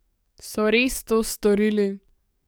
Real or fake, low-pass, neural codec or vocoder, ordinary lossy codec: fake; none; codec, 44.1 kHz, 7.8 kbps, DAC; none